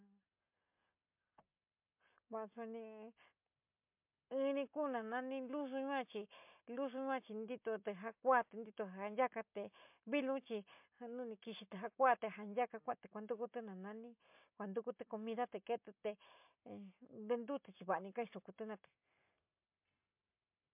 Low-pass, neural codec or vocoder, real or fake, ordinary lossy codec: 3.6 kHz; none; real; MP3, 32 kbps